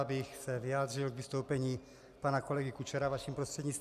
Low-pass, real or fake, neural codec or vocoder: 14.4 kHz; real; none